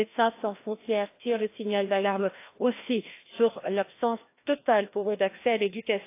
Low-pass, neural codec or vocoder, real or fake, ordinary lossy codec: 3.6 kHz; codec, 16 kHz, 1 kbps, FreqCodec, larger model; fake; AAC, 24 kbps